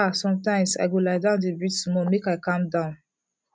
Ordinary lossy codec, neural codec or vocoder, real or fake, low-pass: none; none; real; none